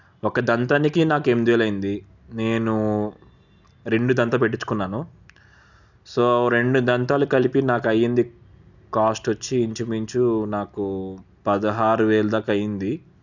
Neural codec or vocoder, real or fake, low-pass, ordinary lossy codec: none; real; 7.2 kHz; none